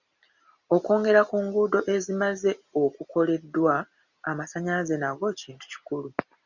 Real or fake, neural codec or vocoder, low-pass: real; none; 7.2 kHz